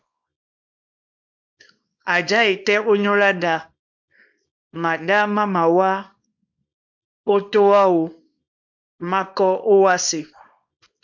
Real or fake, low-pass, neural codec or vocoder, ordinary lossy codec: fake; 7.2 kHz; codec, 24 kHz, 0.9 kbps, WavTokenizer, small release; MP3, 64 kbps